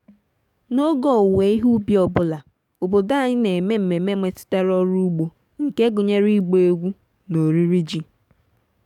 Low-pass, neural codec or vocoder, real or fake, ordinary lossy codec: 19.8 kHz; codec, 44.1 kHz, 7.8 kbps, DAC; fake; none